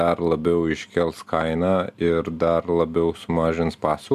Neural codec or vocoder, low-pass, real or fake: none; 14.4 kHz; real